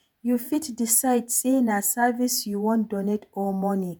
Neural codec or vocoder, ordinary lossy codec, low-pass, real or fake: vocoder, 48 kHz, 128 mel bands, Vocos; none; none; fake